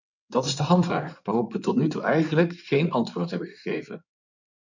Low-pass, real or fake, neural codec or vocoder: 7.2 kHz; fake; codec, 16 kHz in and 24 kHz out, 2.2 kbps, FireRedTTS-2 codec